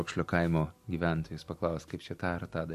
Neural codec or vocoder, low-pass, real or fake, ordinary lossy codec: none; 14.4 kHz; real; MP3, 64 kbps